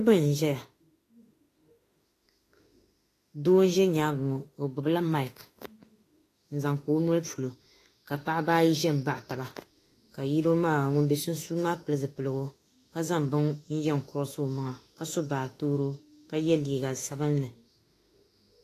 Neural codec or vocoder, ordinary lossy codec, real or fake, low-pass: autoencoder, 48 kHz, 32 numbers a frame, DAC-VAE, trained on Japanese speech; AAC, 48 kbps; fake; 14.4 kHz